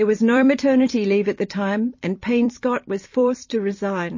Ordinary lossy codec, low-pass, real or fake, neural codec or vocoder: MP3, 32 kbps; 7.2 kHz; fake; vocoder, 44.1 kHz, 128 mel bands every 256 samples, BigVGAN v2